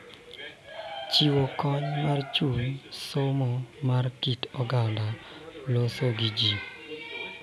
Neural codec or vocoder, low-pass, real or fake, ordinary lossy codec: none; none; real; none